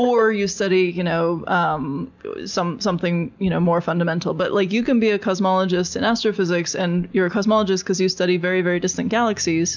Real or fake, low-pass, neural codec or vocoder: real; 7.2 kHz; none